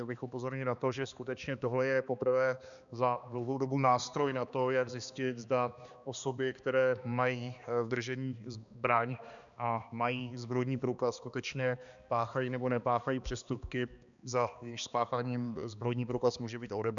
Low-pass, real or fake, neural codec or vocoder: 7.2 kHz; fake; codec, 16 kHz, 2 kbps, X-Codec, HuBERT features, trained on balanced general audio